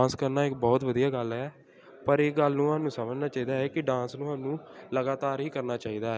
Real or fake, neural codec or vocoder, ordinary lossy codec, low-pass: real; none; none; none